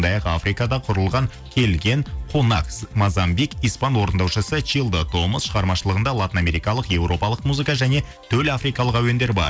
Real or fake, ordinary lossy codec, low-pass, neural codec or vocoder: real; none; none; none